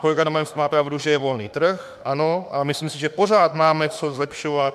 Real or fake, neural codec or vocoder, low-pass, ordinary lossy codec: fake; autoencoder, 48 kHz, 32 numbers a frame, DAC-VAE, trained on Japanese speech; 14.4 kHz; MP3, 96 kbps